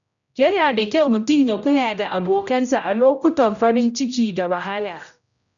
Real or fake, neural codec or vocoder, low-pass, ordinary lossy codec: fake; codec, 16 kHz, 0.5 kbps, X-Codec, HuBERT features, trained on general audio; 7.2 kHz; none